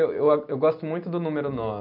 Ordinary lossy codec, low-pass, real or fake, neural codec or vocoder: none; 5.4 kHz; real; none